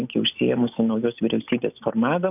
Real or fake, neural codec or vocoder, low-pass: real; none; 3.6 kHz